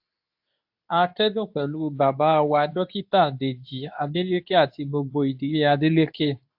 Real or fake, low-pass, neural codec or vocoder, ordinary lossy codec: fake; 5.4 kHz; codec, 24 kHz, 0.9 kbps, WavTokenizer, medium speech release version 2; AAC, 48 kbps